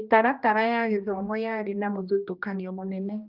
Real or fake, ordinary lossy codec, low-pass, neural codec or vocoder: fake; Opus, 24 kbps; 5.4 kHz; codec, 16 kHz, 1 kbps, X-Codec, HuBERT features, trained on general audio